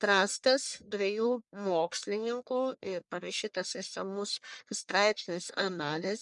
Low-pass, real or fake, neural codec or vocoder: 10.8 kHz; fake; codec, 44.1 kHz, 1.7 kbps, Pupu-Codec